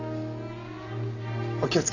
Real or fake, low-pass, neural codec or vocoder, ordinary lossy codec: real; 7.2 kHz; none; none